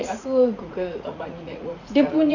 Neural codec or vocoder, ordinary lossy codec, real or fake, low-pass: vocoder, 44.1 kHz, 80 mel bands, Vocos; none; fake; 7.2 kHz